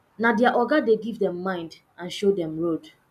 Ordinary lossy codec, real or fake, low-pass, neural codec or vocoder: none; real; 14.4 kHz; none